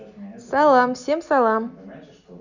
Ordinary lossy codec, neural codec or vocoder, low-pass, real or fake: none; none; 7.2 kHz; real